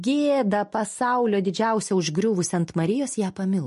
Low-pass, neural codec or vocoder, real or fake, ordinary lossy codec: 14.4 kHz; none; real; MP3, 48 kbps